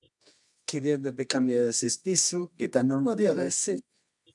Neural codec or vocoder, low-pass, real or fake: codec, 24 kHz, 0.9 kbps, WavTokenizer, medium music audio release; 10.8 kHz; fake